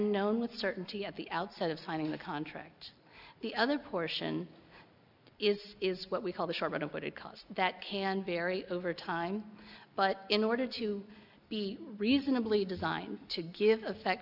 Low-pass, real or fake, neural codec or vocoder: 5.4 kHz; real; none